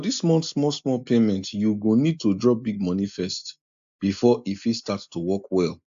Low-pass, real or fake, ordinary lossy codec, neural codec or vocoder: 7.2 kHz; real; AAC, 64 kbps; none